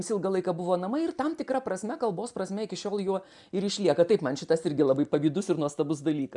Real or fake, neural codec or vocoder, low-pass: real; none; 10.8 kHz